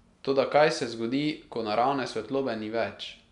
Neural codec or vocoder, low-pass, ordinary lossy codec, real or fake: none; 10.8 kHz; MP3, 96 kbps; real